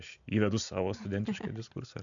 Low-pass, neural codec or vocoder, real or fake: 7.2 kHz; none; real